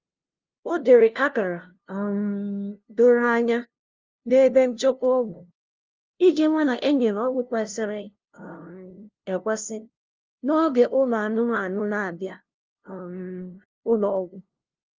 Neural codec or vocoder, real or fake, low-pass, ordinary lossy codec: codec, 16 kHz, 0.5 kbps, FunCodec, trained on LibriTTS, 25 frames a second; fake; 7.2 kHz; Opus, 32 kbps